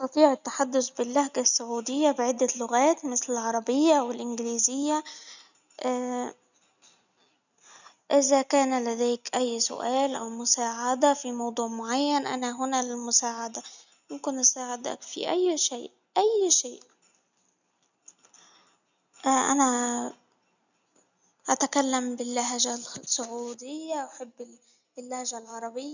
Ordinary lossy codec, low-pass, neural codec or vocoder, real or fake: none; 7.2 kHz; none; real